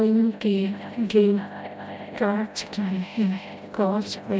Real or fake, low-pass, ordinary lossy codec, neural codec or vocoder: fake; none; none; codec, 16 kHz, 0.5 kbps, FreqCodec, smaller model